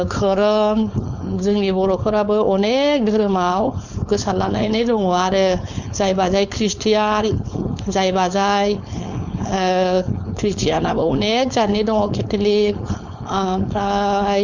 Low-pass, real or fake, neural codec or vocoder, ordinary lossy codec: 7.2 kHz; fake; codec, 16 kHz, 4.8 kbps, FACodec; Opus, 64 kbps